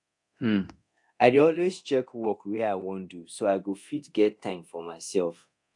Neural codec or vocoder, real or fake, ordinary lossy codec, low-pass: codec, 24 kHz, 0.9 kbps, DualCodec; fake; AAC, 64 kbps; 10.8 kHz